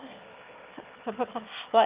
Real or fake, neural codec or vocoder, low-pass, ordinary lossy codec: fake; autoencoder, 22.05 kHz, a latent of 192 numbers a frame, VITS, trained on one speaker; 3.6 kHz; Opus, 24 kbps